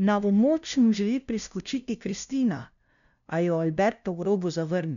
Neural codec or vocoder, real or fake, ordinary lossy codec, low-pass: codec, 16 kHz, 0.5 kbps, FunCodec, trained on LibriTTS, 25 frames a second; fake; none; 7.2 kHz